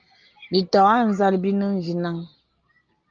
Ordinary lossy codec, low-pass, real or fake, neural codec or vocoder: Opus, 24 kbps; 7.2 kHz; real; none